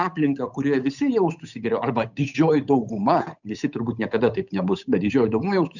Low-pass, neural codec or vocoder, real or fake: 7.2 kHz; codec, 16 kHz, 8 kbps, FunCodec, trained on Chinese and English, 25 frames a second; fake